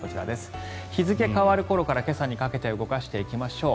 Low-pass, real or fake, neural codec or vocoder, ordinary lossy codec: none; real; none; none